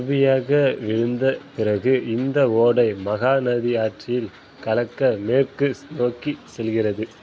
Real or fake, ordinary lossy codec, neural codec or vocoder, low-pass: real; none; none; none